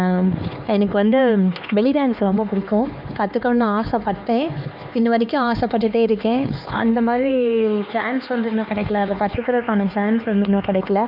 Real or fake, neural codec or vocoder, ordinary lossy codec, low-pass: fake; codec, 16 kHz, 4 kbps, X-Codec, HuBERT features, trained on LibriSpeech; none; 5.4 kHz